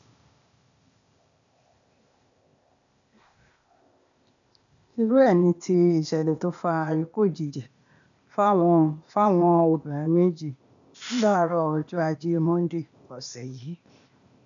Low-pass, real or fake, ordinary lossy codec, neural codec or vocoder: 7.2 kHz; fake; MP3, 96 kbps; codec, 16 kHz, 0.8 kbps, ZipCodec